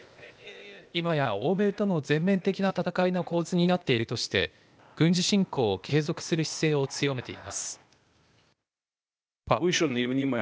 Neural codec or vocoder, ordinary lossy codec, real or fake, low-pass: codec, 16 kHz, 0.8 kbps, ZipCodec; none; fake; none